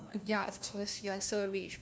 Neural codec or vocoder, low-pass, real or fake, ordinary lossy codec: codec, 16 kHz, 1 kbps, FunCodec, trained on LibriTTS, 50 frames a second; none; fake; none